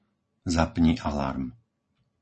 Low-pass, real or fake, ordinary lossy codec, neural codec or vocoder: 9.9 kHz; real; MP3, 32 kbps; none